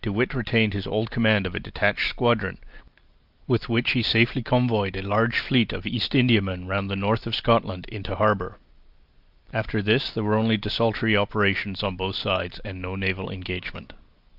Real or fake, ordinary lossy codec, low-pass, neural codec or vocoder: real; Opus, 24 kbps; 5.4 kHz; none